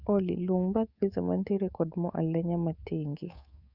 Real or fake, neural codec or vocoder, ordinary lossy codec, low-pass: fake; codec, 24 kHz, 3.1 kbps, DualCodec; Opus, 64 kbps; 5.4 kHz